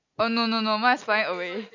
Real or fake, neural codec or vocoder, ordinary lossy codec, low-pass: real; none; none; 7.2 kHz